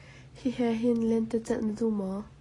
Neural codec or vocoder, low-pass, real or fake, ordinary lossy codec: none; 10.8 kHz; real; AAC, 32 kbps